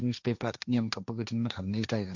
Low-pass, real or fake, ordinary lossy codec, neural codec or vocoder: 7.2 kHz; fake; none; codec, 16 kHz, 1.1 kbps, Voila-Tokenizer